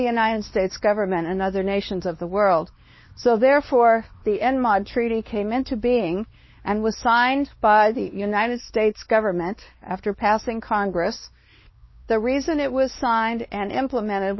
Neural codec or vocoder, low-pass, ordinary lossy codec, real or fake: codec, 16 kHz, 2 kbps, X-Codec, WavLM features, trained on Multilingual LibriSpeech; 7.2 kHz; MP3, 24 kbps; fake